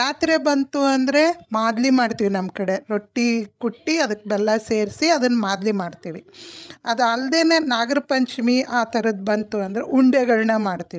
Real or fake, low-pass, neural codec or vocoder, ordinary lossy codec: fake; none; codec, 16 kHz, 16 kbps, FreqCodec, larger model; none